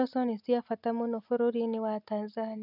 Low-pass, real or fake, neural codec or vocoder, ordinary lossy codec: 5.4 kHz; real; none; none